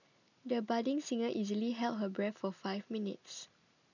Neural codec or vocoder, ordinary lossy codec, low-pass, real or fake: none; none; 7.2 kHz; real